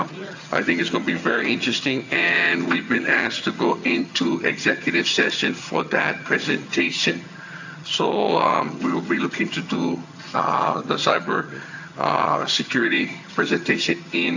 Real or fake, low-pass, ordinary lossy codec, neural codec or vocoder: fake; 7.2 kHz; AAC, 48 kbps; vocoder, 22.05 kHz, 80 mel bands, HiFi-GAN